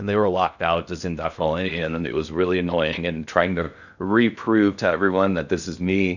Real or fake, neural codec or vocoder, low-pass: fake; codec, 16 kHz in and 24 kHz out, 0.6 kbps, FocalCodec, streaming, 2048 codes; 7.2 kHz